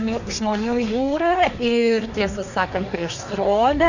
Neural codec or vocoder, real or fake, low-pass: codec, 24 kHz, 1 kbps, SNAC; fake; 7.2 kHz